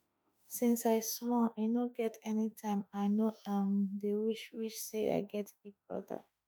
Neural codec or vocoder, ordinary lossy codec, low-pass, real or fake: autoencoder, 48 kHz, 32 numbers a frame, DAC-VAE, trained on Japanese speech; none; none; fake